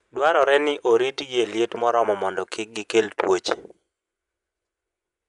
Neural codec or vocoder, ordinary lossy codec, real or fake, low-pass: vocoder, 24 kHz, 100 mel bands, Vocos; none; fake; 10.8 kHz